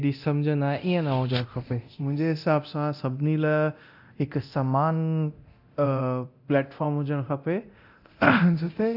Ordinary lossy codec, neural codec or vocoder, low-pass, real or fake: AAC, 48 kbps; codec, 24 kHz, 0.9 kbps, DualCodec; 5.4 kHz; fake